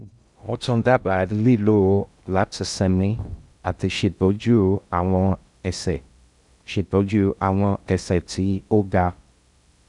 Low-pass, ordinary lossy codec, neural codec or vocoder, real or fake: 10.8 kHz; none; codec, 16 kHz in and 24 kHz out, 0.6 kbps, FocalCodec, streaming, 2048 codes; fake